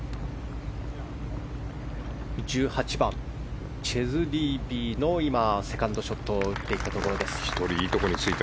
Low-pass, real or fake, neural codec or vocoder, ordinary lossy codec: none; real; none; none